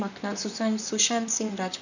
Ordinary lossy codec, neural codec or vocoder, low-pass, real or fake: none; vocoder, 44.1 kHz, 128 mel bands, Pupu-Vocoder; 7.2 kHz; fake